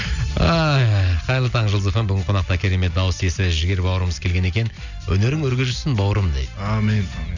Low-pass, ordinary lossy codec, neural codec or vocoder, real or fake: 7.2 kHz; none; none; real